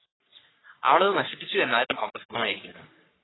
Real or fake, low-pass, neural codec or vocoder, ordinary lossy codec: fake; 7.2 kHz; codec, 44.1 kHz, 3.4 kbps, Pupu-Codec; AAC, 16 kbps